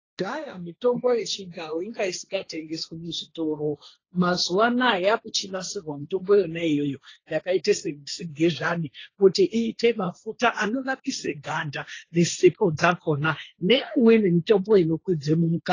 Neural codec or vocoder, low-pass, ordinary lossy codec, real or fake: codec, 16 kHz, 1.1 kbps, Voila-Tokenizer; 7.2 kHz; AAC, 32 kbps; fake